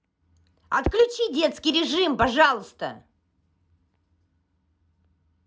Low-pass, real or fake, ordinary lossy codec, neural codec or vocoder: none; real; none; none